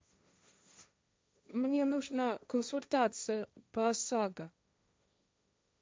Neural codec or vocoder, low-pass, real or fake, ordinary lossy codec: codec, 16 kHz, 1.1 kbps, Voila-Tokenizer; none; fake; none